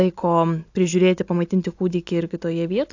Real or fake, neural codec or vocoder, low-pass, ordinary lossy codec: real; none; 7.2 kHz; AAC, 48 kbps